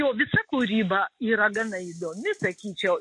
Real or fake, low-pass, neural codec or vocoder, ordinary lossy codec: real; 10.8 kHz; none; MP3, 48 kbps